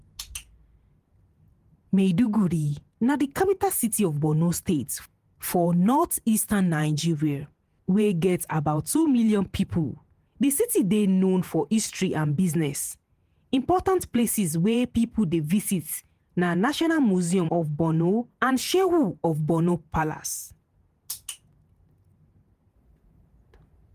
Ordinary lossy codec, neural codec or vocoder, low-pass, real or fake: Opus, 24 kbps; vocoder, 48 kHz, 128 mel bands, Vocos; 14.4 kHz; fake